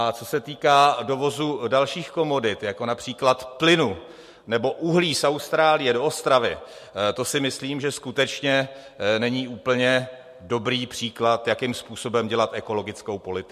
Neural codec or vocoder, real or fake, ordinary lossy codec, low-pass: none; real; MP3, 64 kbps; 14.4 kHz